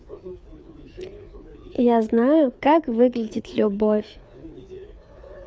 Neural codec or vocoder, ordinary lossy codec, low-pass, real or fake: codec, 16 kHz, 4 kbps, FreqCodec, larger model; none; none; fake